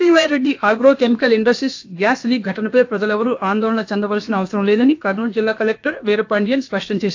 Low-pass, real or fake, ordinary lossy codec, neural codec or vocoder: 7.2 kHz; fake; AAC, 48 kbps; codec, 16 kHz, about 1 kbps, DyCAST, with the encoder's durations